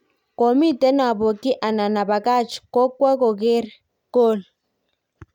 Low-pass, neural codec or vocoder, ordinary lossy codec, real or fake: 19.8 kHz; none; none; real